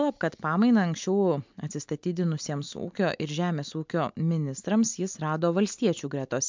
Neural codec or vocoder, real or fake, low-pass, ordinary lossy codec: none; real; 7.2 kHz; MP3, 64 kbps